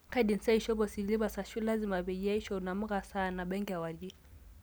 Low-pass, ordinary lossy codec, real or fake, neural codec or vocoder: none; none; real; none